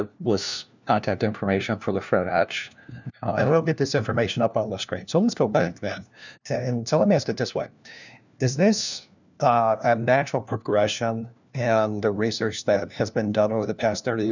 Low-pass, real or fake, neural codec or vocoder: 7.2 kHz; fake; codec, 16 kHz, 1 kbps, FunCodec, trained on LibriTTS, 50 frames a second